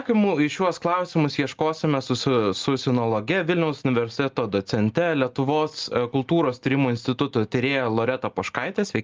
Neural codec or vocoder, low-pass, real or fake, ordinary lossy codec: none; 7.2 kHz; real; Opus, 32 kbps